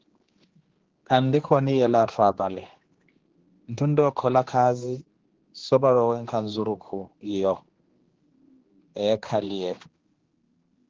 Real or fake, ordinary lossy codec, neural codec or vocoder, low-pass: fake; Opus, 16 kbps; codec, 16 kHz, 2 kbps, X-Codec, HuBERT features, trained on general audio; 7.2 kHz